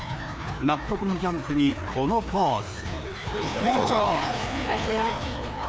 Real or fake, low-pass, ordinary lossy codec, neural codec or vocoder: fake; none; none; codec, 16 kHz, 2 kbps, FreqCodec, larger model